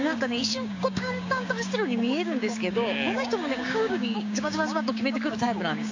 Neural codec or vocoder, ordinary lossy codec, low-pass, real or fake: codec, 16 kHz, 6 kbps, DAC; AAC, 48 kbps; 7.2 kHz; fake